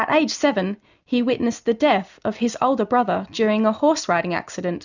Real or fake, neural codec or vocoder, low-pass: real; none; 7.2 kHz